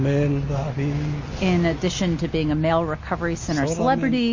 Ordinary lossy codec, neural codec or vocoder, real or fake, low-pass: MP3, 32 kbps; none; real; 7.2 kHz